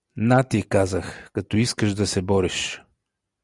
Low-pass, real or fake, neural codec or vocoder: 10.8 kHz; real; none